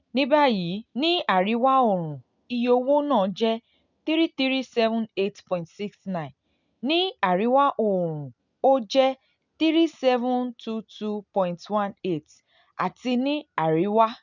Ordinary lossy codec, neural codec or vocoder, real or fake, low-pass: none; none; real; 7.2 kHz